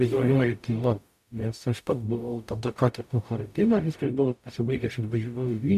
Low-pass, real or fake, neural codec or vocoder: 14.4 kHz; fake; codec, 44.1 kHz, 0.9 kbps, DAC